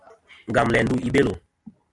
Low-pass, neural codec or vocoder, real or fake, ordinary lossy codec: 10.8 kHz; none; real; AAC, 64 kbps